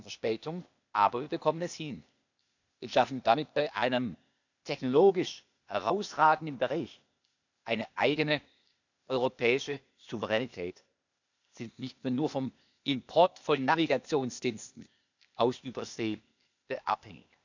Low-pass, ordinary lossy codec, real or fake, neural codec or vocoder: 7.2 kHz; none; fake; codec, 16 kHz, 0.8 kbps, ZipCodec